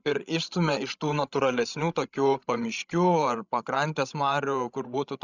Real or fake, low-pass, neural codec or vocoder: fake; 7.2 kHz; codec, 16 kHz, 16 kbps, FreqCodec, larger model